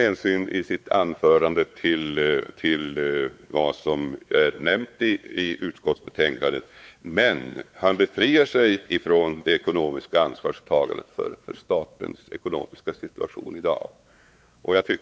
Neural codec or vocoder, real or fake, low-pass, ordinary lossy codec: codec, 16 kHz, 4 kbps, X-Codec, WavLM features, trained on Multilingual LibriSpeech; fake; none; none